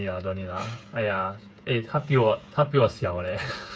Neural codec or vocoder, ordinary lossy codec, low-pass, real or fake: codec, 16 kHz, 8 kbps, FreqCodec, smaller model; none; none; fake